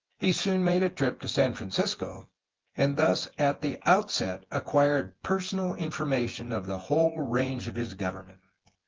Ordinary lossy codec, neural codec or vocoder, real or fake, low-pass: Opus, 16 kbps; vocoder, 24 kHz, 100 mel bands, Vocos; fake; 7.2 kHz